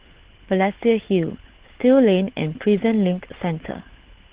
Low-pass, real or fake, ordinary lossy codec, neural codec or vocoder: 3.6 kHz; fake; Opus, 16 kbps; codec, 16 kHz, 16 kbps, FunCodec, trained on LibriTTS, 50 frames a second